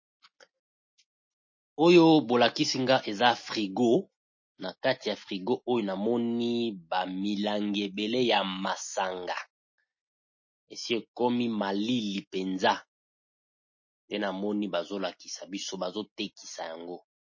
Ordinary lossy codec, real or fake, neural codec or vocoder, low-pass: MP3, 32 kbps; real; none; 7.2 kHz